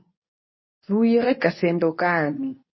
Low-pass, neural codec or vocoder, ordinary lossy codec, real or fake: 7.2 kHz; codec, 24 kHz, 0.9 kbps, WavTokenizer, medium speech release version 2; MP3, 24 kbps; fake